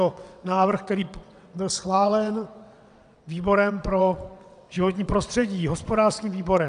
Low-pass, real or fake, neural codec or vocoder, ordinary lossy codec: 9.9 kHz; fake; vocoder, 22.05 kHz, 80 mel bands, WaveNeXt; AAC, 96 kbps